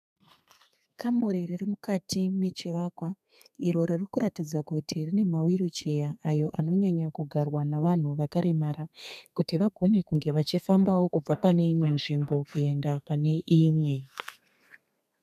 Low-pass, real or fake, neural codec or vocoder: 14.4 kHz; fake; codec, 32 kHz, 1.9 kbps, SNAC